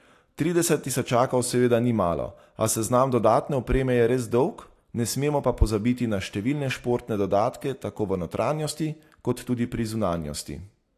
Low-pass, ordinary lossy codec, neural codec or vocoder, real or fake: 14.4 kHz; AAC, 64 kbps; none; real